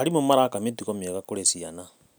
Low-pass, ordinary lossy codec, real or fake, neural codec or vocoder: none; none; real; none